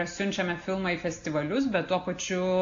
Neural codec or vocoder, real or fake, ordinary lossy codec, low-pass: none; real; AAC, 48 kbps; 7.2 kHz